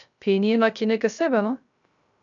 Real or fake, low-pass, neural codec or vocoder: fake; 7.2 kHz; codec, 16 kHz, 0.3 kbps, FocalCodec